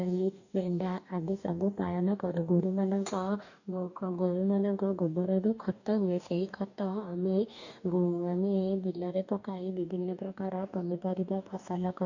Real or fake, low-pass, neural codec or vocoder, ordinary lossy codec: fake; 7.2 kHz; codec, 44.1 kHz, 2.6 kbps, DAC; none